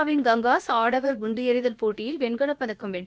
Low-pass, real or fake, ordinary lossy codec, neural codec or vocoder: none; fake; none; codec, 16 kHz, about 1 kbps, DyCAST, with the encoder's durations